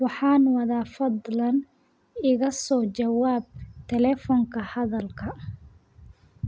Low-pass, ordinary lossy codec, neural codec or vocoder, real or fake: none; none; none; real